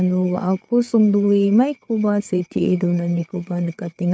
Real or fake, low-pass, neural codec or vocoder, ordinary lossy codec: fake; none; codec, 16 kHz, 4 kbps, FreqCodec, larger model; none